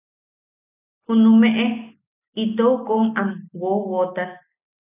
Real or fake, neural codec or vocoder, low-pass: real; none; 3.6 kHz